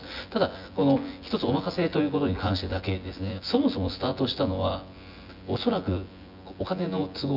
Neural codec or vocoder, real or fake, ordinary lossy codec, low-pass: vocoder, 24 kHz, 100 mel bands, Vocos; fake; none; 5.4 kHz